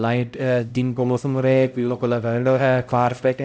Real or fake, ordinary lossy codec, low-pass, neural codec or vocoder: fake; none; none; codec, 16 kHz, 0.5 kbps, X-Codec, HuBERT features, trained on LibriSpeech